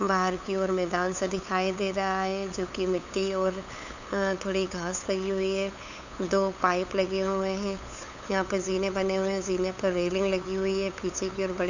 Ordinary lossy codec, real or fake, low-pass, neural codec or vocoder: none; fake; 7.2 kHz; codec, 16 kHz, 8 kbps, FunCodec, trained on LibriTTS, 25 frames a second